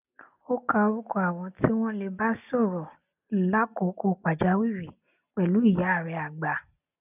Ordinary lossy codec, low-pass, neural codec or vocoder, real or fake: none; 3.6 kHz; none; real